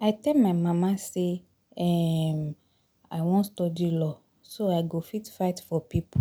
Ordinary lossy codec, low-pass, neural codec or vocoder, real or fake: none; none; none; real